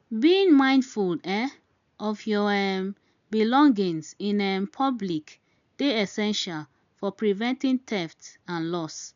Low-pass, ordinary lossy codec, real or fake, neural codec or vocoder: 7.2 kHz; none; real; none